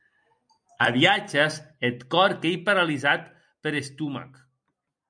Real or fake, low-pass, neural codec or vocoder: real; 9.9 kHz; none